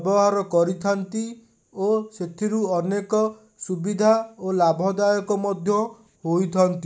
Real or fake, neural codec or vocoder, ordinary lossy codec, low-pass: real; none; none; none